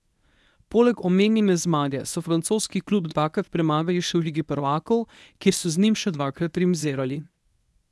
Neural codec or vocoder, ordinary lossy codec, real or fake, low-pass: codec, 24 kHz, 0.9 kbps, WavTokenizer, medium speech release version 1; none; fake; none